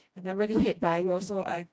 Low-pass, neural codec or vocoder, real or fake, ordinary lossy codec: none; codec, 16 kHz, 1 kbps, FreqCodec, smaller model; fake; none